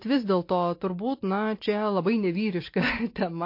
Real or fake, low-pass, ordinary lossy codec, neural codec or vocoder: real; 5.4 kHz; MP3, 32 kbps; none